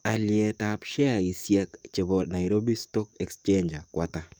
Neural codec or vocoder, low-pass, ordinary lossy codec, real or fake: codec, 44.1 kHz, 7.8 kbps, DAC; none; none; fake